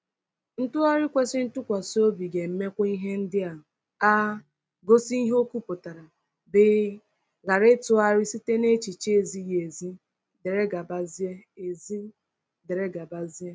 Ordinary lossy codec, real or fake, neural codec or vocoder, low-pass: none; real; none; none